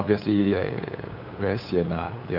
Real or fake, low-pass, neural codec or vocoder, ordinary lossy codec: fake; 5.4 kHz; codec, 16 kHz, 8 kbps, FunCodec, trained on LibriTTS, 25 frames a second; none